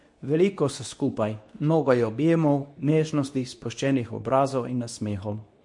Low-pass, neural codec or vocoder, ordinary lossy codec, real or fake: 10.8 kHz; codec, 24 kHz, 0.9 kbps, WavTokenizer, medium speech release version 2; MP3, 48 kbps; fake